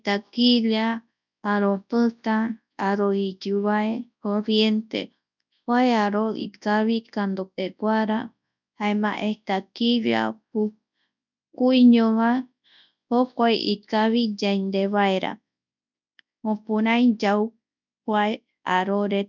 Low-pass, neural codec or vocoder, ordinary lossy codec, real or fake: 7.2 kHz; codec, 24 kHz, 0.9 kbps, WavTokenizer, large speech release; none; fake